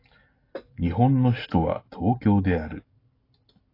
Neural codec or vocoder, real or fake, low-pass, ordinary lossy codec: none; real; 5.4 kHz; AAC, 24 kbps